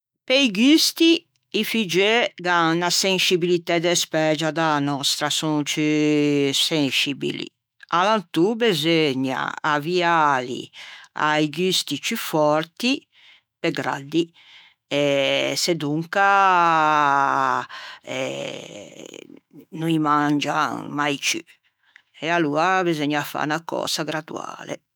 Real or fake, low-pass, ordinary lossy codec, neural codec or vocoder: fake; none; none; autoencoder, 48 kHz, 128 numbers a frame, DAC-VAE, trained on Japanese speech